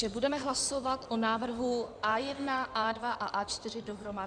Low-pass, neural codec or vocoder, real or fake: 9.9 kHz; codec, 16 kHz in and 24 kHz out, 2.2 kbps, FireRedTTS-2 codec; fake